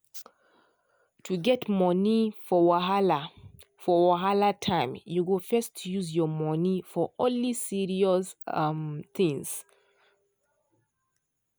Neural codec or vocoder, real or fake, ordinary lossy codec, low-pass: none; real; none; none